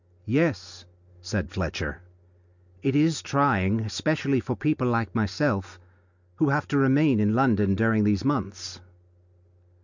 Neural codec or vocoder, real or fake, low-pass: none; real; 7.2 kHz